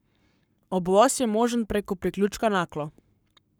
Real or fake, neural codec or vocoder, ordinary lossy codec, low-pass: fake; codec, 44.1 kHz, 7.8 kbps, Pupu-Codec; none; none